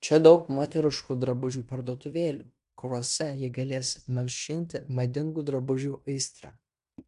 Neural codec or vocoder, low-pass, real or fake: codec, 16 kHz in and 24 kHz out, 0.9 kbps, LongCat-Audio-Codec, fine tuned four codebook decoder; 10.8 kHz; fake